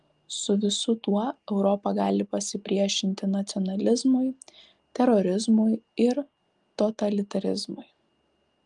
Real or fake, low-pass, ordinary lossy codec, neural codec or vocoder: real; 10.8 kHz; Opus, 32 kbps; none